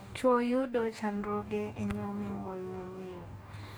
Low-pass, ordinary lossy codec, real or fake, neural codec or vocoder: none; none; fake; codec, 44.1 kHz, 2.6 kbps, DAC